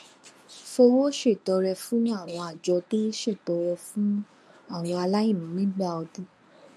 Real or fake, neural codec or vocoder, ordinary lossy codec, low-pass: fake; codec, 24 kHz, 0.9 kbps, WavTokenizer, medium speech release version 1; none; none